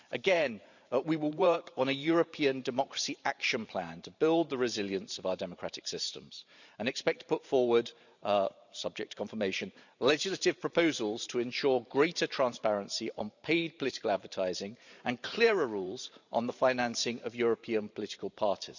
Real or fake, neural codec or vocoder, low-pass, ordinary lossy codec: fake; vocoder, 44.1 kHz, 128 mel bands every 512 samples, BigVGAN v2; 7.2 kHz; none